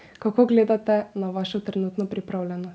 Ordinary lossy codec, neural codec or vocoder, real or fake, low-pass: none; none; real; none